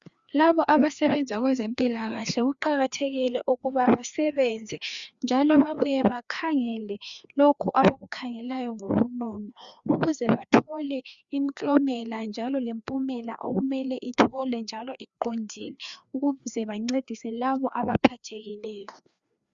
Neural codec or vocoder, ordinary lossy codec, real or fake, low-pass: codec, 16 kHz, 2 kbps, FreqCodec, larger model; Opus, 64 kbps; fake; 7.2 kHz